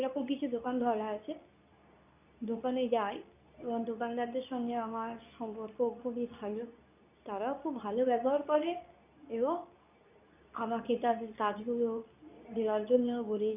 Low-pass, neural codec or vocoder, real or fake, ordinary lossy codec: 3.6 kHz; codec, 24 kHz, 0.9 kbps, WavTokenizer, medium speech release version 2; fake; none